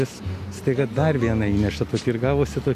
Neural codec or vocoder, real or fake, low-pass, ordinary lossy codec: none; real; 14.4 kHz; Opus, 64 kbps